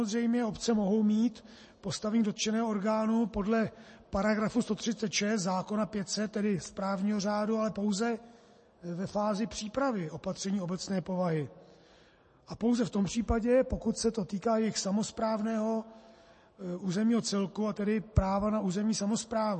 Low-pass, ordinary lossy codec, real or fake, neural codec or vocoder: 10.8 kHz; MP3, 32 kbps; real; none